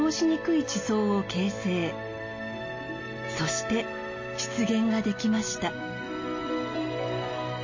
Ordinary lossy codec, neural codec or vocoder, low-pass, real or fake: MP3, 32 kbps; none; 7.2 kHz; real